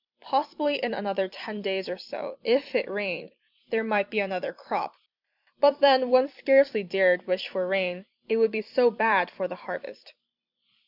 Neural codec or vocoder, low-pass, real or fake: vocoder, 44.1 kHz, 128 mel bands every 256 samples, BigVGAN v2; 5.4 kHz; fake